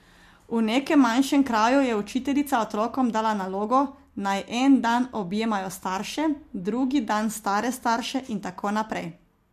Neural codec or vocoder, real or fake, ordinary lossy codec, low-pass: none; real; MP3, 64 kbps; 14.4 kHz